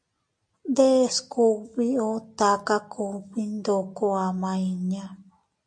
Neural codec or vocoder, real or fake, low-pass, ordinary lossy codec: none; real; 9.9 kHz; MP3, 48 kbps